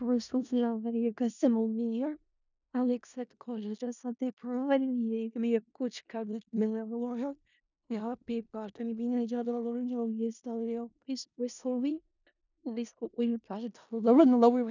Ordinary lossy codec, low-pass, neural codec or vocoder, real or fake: none; 7.2 kHz; codec, 16 kHz in and 24 kHz out, 0.4 kbps, LongCat-Audio-Codec, four codebook decoder; fake